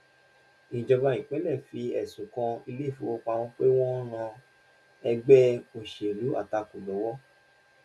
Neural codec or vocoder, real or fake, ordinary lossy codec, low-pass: none; real; none; none